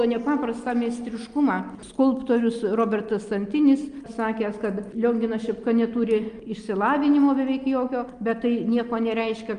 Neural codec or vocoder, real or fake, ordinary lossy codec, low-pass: none; real; Opus, 32 kbps; 9.9 kHz